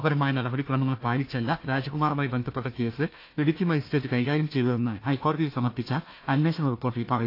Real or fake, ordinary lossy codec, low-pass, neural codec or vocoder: fake; AAC, 32 kbps; 5.4 kHz; codec, 16 kHz, 1 kbps, FunCodec, trained on Chinese and English, 50 frames a second